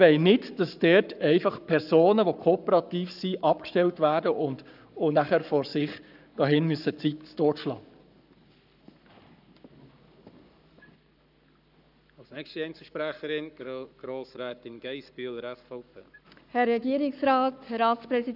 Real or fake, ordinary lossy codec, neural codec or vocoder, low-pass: fake; none; codec, 44.1 kHz, 7.8 kbps, Pupu-Codec; 5.4 kHz